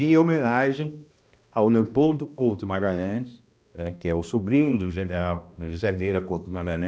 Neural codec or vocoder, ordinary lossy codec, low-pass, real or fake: codec, 16 kHz, 1 kbps, X-Codec, HuBERT features, trained on balanced general audio; none; none; fake